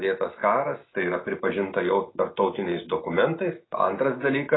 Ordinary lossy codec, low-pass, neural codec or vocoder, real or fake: AAC, 16 kbps; 7.2 kHz; none; real